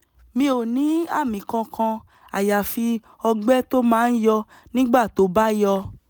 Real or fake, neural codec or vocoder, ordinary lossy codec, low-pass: real; none; none; none